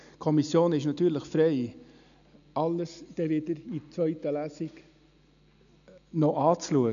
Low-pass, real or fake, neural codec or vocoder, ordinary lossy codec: 7.2 kHz; real; none; none